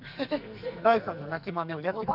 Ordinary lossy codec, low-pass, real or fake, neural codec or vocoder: none; 5.4 kHz; fake; codec, 44.1 kHz, 2.6 kbps, SNAC